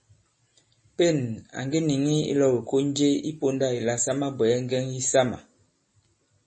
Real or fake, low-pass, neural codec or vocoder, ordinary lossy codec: real; 10.8 kHz; none; MP3, 32 kbps